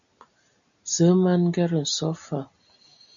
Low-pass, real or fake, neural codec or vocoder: 7.2 kHz; real; none